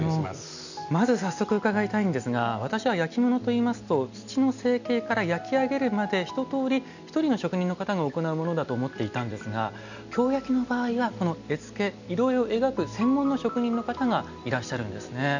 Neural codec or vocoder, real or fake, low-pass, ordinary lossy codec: none; real; 7.2 kHz; none